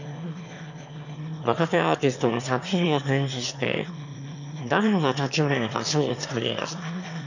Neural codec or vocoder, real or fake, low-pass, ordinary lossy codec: autoencoder, 22.05 kHz, a latent of 192 numbers a frame, VITS, trained on one speaker; fake; 7.2 kHz; none